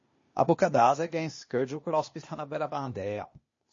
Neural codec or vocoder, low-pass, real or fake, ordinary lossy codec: codec, 16 kHz, 0.8 kbps, ZipCodec; 7.2 kHz; fake; MP3, 32 kbps